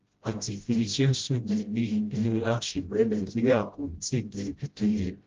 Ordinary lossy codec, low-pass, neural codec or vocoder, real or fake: Opus, 32 kbps; 7.2 kHz; codec, 16 kHz, 0.5 kbps, FreqCodec, smaller model; fake